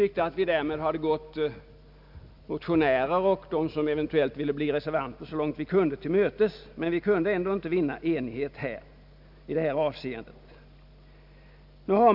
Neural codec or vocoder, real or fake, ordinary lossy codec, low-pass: none; real; none; 5.4 kHz